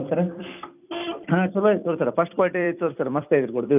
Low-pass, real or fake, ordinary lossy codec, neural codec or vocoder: 3.6 kHz; fake; none; vocoder, 44.1 kHz, 128 mel bands every 256 samples, BigVGAN v2